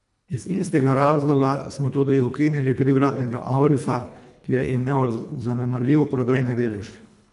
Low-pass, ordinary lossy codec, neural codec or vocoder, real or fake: 10.8 kHz; none; codec, 24 kHz, 1.5 kbps, HILCodec; fake